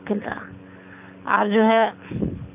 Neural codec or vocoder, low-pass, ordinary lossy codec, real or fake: codec, 24 kHz, 6 kbps, HILCodec; 3.6 kHz; AAC, 32 kbps; fake